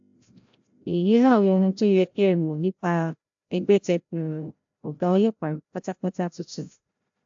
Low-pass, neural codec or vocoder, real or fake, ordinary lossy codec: 7.2 kHz; codec, 16 kHz, 0.5 kbps, FreqCodec, larger model; fake; AAC, 64 kbps